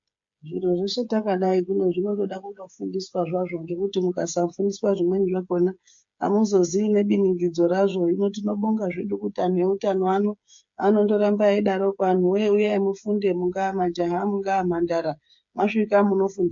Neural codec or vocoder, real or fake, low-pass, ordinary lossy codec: codec, 16 kHz, 8 kbps, FreqCodec, smaller model; fake; 7.2 kHz; MP3, 48 kbps